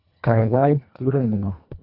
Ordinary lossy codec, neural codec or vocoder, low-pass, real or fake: none; codec, 24 kHz, 1.5 kbps, HILCodec; 5.4 kHz; fake